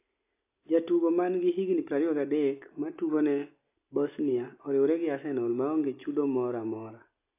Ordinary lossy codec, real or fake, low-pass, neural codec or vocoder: AAC, 24 kbps; real; 3.6 kHz; none